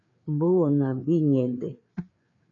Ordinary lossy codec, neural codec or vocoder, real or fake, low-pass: MP3, 64 kbps; codec, 16 kHz, 4 kbps, FreqCodec, larger model; fake; 7.2 kHz